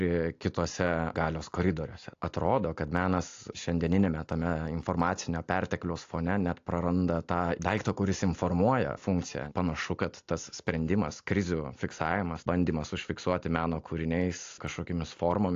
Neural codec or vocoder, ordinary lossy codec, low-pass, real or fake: none; AAC, 48 kbps; 7.2 kHz; real